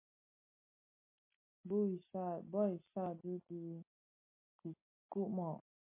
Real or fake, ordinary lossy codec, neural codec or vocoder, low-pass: real; MP3, 24 kbps; none; 3.6 kHz